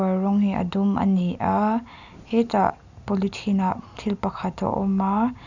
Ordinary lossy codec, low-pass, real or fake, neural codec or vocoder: none; 7.2 kHz; real; none